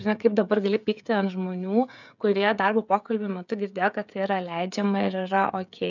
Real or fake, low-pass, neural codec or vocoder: fake; 7.2 kHz; codec, 16 kHz, 16 kbps, FreqCodec, smaller model